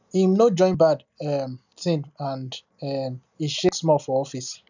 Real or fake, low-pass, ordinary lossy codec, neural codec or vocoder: real; 7.2 kHz; none; none